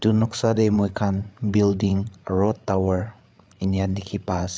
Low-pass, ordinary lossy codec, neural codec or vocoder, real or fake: none; none; codec, 16 kHz, 8 kbps, FreqCodec, larger model; fake